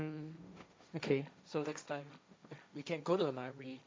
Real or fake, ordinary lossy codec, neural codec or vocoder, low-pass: fake; none; codec, 16 kHz, 1.1 kbps, Voila-Tokenizer; none